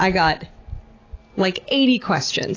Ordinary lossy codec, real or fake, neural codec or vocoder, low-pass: AAC, 32 kbps; real; none; 7.2 kHz